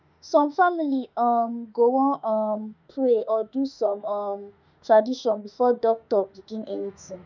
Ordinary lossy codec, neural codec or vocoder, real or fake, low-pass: none; autoencoder, 48 kHz, 32 numbers a frame, DAC-VAE, trained on Japanese speech; fake; 7.2 kHz